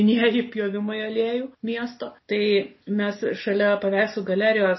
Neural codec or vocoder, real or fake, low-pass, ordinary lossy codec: none; real; 7.2 kHz; MP3, 24 kbps